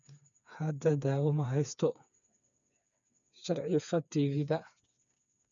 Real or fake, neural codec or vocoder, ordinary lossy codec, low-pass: fake; codec, 16 kHz, 4 kbps, FreqCodec, smaller model; none; 7.2 kHz